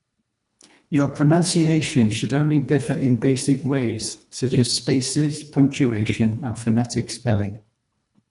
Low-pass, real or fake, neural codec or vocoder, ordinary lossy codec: 10.8 kHz; fake; codec, 24 kHz, 1.5 kbps, HILCodec; none